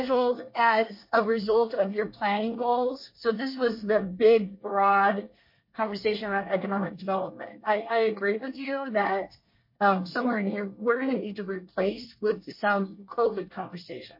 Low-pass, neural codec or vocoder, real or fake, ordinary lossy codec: 5.4 kHz; codec, 24 kHz, 1 kbps, SNAC; fake; MP3, 32 kbps